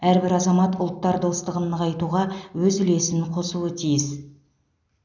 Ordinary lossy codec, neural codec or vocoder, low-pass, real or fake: none; none; 7.2 kHz; real